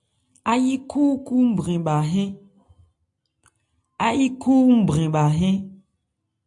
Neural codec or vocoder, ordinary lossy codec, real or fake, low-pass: none; AAC, 64 kbps; real; 10.8 kHz